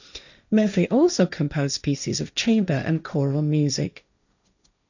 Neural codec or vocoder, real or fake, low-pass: codec, 16 kHz, 1.1 kbps, Voila-Tokenizer; fake; 7.2 kHz